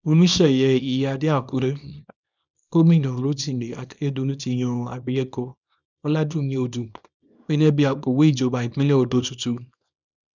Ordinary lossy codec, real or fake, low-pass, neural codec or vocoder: none; fake; 7.2 kHz; codec, 24 kHz, 0.9 kbps, WavTokenizer, small release